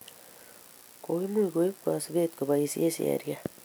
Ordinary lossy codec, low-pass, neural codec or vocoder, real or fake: none; none; none; real